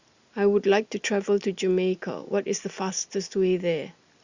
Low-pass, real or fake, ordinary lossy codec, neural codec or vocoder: 7.2 kHz; real; Opus, 64 kbps; none